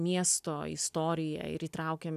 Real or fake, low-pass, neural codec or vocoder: real; 14.4 kHz; none